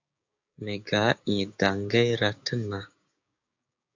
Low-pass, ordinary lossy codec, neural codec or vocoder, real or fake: 7.2 kHz; AAC, 48 kbps; codec, 16 kHz, 6 kbps, DAC; fake